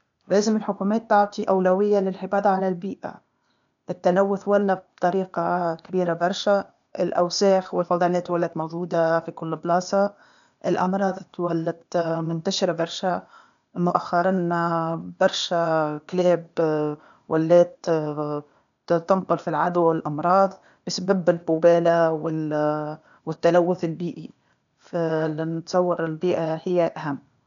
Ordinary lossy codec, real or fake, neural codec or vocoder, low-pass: none; fake; codec, 16 kHz, 0.8 kbps, ZipCodec; 7.2 kHz